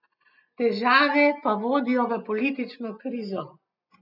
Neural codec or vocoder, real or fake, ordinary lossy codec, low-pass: none; real; none; 5.4 kHz